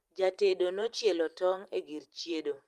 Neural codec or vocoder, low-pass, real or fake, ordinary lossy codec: vocoder, 44.1 kHz, 128 mel bands every 512 samples, BigVGAN v2; 14.4 kHz; fake; Opus, 32 kbps